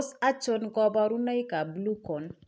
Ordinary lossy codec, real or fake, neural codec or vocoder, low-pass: none; real; none; none